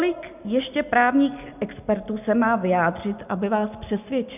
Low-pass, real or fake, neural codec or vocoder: 3.6 kHz; real; none